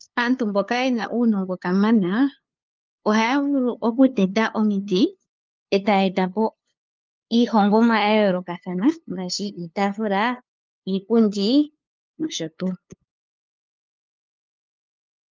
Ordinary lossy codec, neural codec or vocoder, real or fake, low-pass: Opus, 24 kbps; codec, 16 kHz, 2 kbps, FunCodec, trained on LibriTTS, 25 frames a second; fake; 7.2 kHz